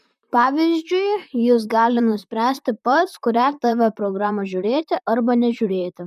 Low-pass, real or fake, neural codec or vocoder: 14.4 kHz; fake; vocoder, 44.1 kHz, 128 mel bands, Pupu-Vocoder